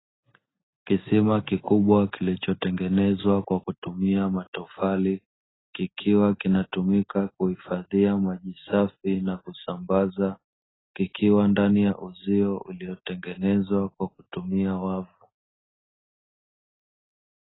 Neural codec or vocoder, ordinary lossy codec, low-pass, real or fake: none; AAC, 16 kbps; 7.2 kHz; real